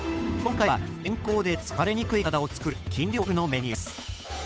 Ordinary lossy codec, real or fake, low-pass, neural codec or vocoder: none; fake; none; codec, 16 kHz, 8 kbps, FunCodec, trained on Chinese and English, 25 frames a second